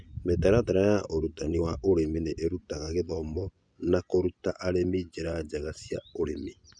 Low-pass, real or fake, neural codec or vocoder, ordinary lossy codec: 9.9 kHz; fake; vocoder, 24 kHz, 100 mel bands, Vocos; none